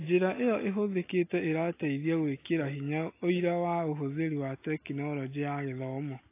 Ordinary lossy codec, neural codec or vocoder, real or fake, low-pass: AAC, 24 kbps; none; real; 3.6 kHz